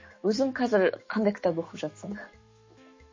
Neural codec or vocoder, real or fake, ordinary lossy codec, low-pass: autoencoder, 48 kHz, 128 numbers a frame, DAC-VAE, trained on Japanese speech; fake; MP3, 32 kbps; 7.2 kHz